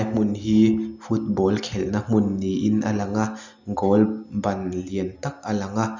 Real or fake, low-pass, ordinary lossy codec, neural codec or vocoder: real; 7.2 kHz; none; none